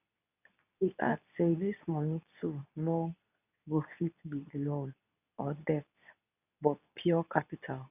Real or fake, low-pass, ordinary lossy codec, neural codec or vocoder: fake; 3.6 kHz; none; codec, 24 kHz, 0.9 kbps, WavTokenizer, medium speech release version 2